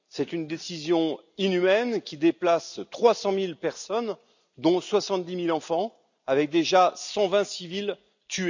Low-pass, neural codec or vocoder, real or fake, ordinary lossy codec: 7.2 kHz; none; real; none